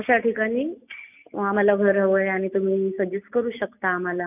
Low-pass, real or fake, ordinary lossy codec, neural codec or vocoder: 3.6 kHz; real; none; none